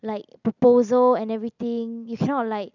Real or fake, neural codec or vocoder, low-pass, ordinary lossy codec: real; none; 7.2 kHz; none